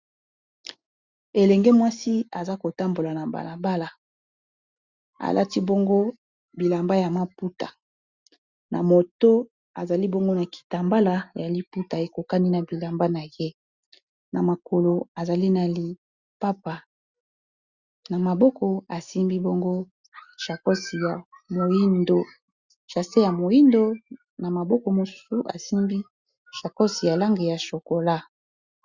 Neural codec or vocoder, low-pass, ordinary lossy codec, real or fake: none; 7.2 kHz; Opus, 64 kbps; real